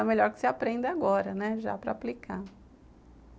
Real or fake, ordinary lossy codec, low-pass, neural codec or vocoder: real; none; none; none